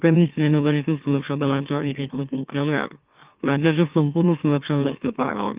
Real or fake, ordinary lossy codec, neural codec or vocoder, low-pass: fake; Opus, 24 kbps; autoencoder, 44.1 kHz, a latent of 192 numbers a frame, MeloTTS; 3.6 kHz